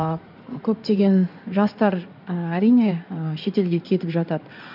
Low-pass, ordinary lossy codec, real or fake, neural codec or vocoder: 5.4 kHz; none; fake; codec, 16 kHz in and 24 kHz out, 2.2 kbps, FireRedTTS-2 codec